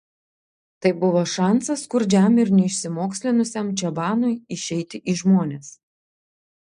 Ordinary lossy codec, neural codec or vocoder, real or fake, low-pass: MP3, 64 kbps; none; real; 9.9 kHz